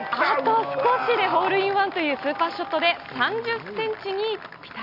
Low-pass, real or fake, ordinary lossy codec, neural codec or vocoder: 5.4 kHz; real; none; none